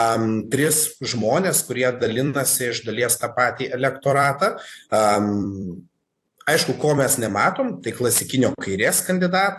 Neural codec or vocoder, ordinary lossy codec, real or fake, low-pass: vocoder, 44.1 kHz, 128 mel bands every 256 samples, BigVGAN v2; AAC, 64 kbps; fake; 14.4 kHz